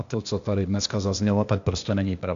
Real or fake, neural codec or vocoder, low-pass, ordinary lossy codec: fake; codec, 16 kHz, 0.8 kbps, ZipCodec; 7.2 kHz; AAC, 64 kbps